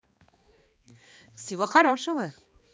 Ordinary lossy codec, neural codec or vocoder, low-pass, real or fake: none; codec, 16 kHz, 2 kbps, X-Codec, HuBERT features, trained on balanced general audio; none; fake